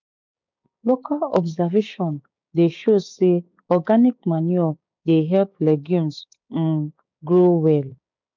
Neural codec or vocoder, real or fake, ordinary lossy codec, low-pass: codec, 16 kHz, 6 kbps, DAC; fake; MP3, 64 kbps; 7.2 kHz